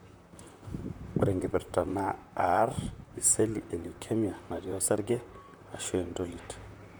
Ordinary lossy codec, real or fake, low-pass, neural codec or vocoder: none; fake; none; vocoder, 44.1 kHz, 128 mel bands, Pupu-Vocoder